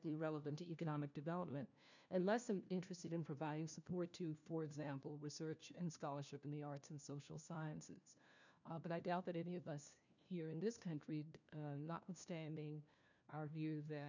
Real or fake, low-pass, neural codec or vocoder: fake; 7.2 kHz; codec, 16 kHz, 1 kbps, FunCodec, trained on LibriTTS, 50 frames a second